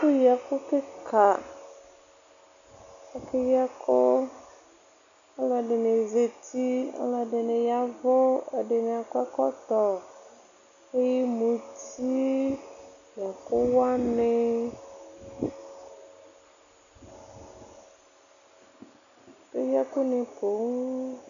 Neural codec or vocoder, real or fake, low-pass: none; real; 7.2 kHz